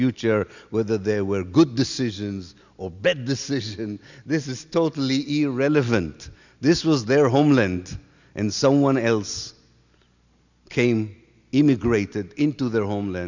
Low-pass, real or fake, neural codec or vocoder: 7.2 kHz; real; none